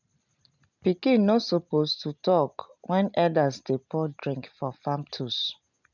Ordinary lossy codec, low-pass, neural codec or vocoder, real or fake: none; 7.2 kHz; none; real